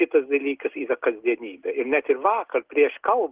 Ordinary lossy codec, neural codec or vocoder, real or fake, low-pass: Opus, 16 kbps; none; real; 3.6 kHz